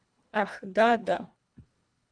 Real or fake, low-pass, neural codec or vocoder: fake; 9.9 kHz; codec, 24 kHz, 1.5 kbps, HILCodec